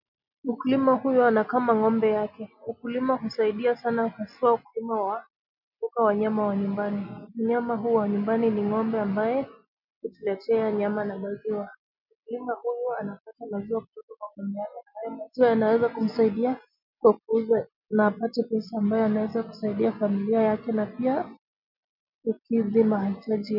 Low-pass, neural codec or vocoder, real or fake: 5.4 kHz; none; real